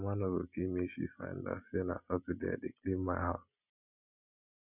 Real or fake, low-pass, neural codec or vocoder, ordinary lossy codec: real; 3.6 kHz; none; none